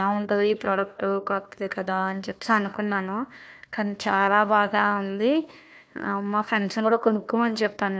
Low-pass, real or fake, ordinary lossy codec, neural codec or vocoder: none; fake; none; codec, 16 kHz, 1 kbps, FunCodec, trained on Chinese and English, 50 frames a second